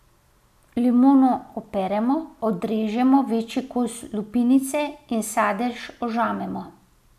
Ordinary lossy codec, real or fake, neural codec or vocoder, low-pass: none; real; none; 14.4 kHz